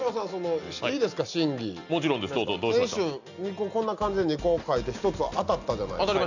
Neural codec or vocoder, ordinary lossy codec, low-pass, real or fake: none; none; 7.2 kHz; real